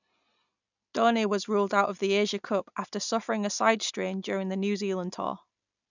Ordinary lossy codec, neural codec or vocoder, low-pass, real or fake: none; none; 7.2 kHz; real